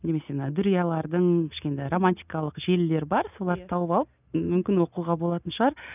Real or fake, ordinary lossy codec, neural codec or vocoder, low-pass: real; none; none; 3.6 kHz